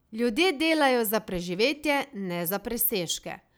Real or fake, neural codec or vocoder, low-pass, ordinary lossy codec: real; none; none; none